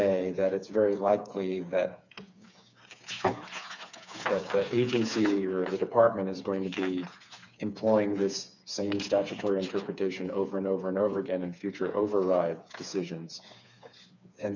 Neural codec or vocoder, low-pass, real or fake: codec, 16 kHz, 4 kbps, FreqCodec, smaller model; 7.2 kHz; fake